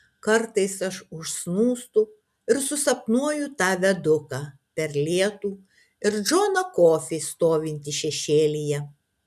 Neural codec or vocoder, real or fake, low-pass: none; real; 14.4 kHz